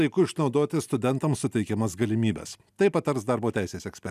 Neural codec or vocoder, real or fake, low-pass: none; real; 14.4 kHz